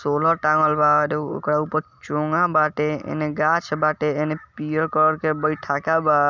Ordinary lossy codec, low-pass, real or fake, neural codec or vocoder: none; 7.2 kHz; real; none